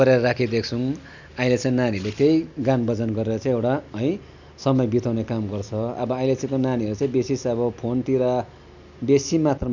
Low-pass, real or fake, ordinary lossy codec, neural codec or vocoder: 7.2 kHz; real; none; none